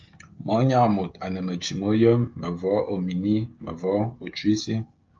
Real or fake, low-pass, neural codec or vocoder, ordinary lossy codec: fake; 7.2 kHz; codec, 16 kHz, 16 kbps, FreqCodec, smaller model; Opus, 24 kbps